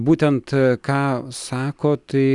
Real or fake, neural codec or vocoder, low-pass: real; none; 10.8 kHz